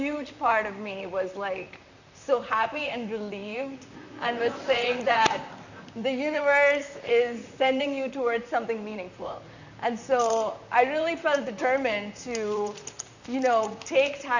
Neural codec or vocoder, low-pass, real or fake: vocoder, 44.1 kHz, 128 mel bands, Pupu-Vocoder; 7.2 kHz; fake